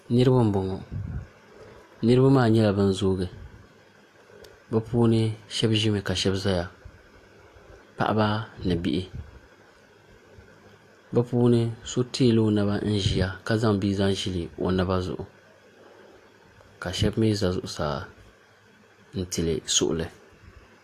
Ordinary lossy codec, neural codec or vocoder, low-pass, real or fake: AAC, 64 kbps; none; 14.4 kHz; real